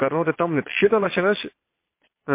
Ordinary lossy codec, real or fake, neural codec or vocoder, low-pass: MP3, 24 kbps; fake; vocoder, 22.05 kHz, 80 mel bands, Vocos; 3.6 kHz